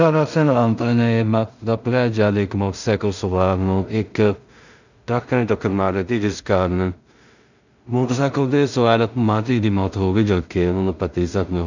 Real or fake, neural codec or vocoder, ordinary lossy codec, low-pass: fake; codec, 16 kHz in and 24 kHz out, 0.4 kbps, LongCat-Audio-Codec, two codebook decoder; none; 7.2 kHz